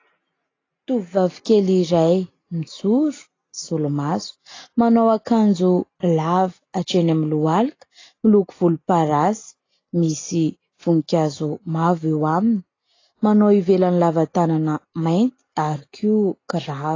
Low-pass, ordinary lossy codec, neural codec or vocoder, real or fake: 7.2 kHz; AAC, 32 kbps; none; real